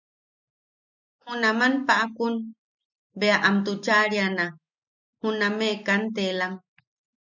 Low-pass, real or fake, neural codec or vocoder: 7.2 kHz; real; none